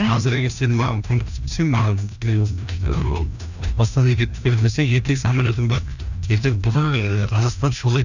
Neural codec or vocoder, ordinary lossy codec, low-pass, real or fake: codec, 16 kHz, 1 kbps, FreqCodec, larger model; none; 7.2 kHz; fake